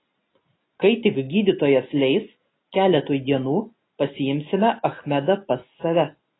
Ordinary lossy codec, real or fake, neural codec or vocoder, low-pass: AAC, 16 kbps; real; none; 7.2 kHz